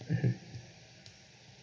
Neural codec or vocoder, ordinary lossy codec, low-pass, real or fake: none; none; none; real